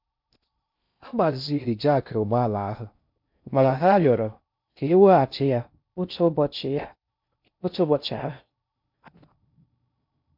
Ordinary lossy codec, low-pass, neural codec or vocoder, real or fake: MP3, 48 kbps; 5.4 kHz; codec, 16 kHz in and 24 kHz out, 0.6 kbps, FocalCodec, streaming, 2048 codes; fake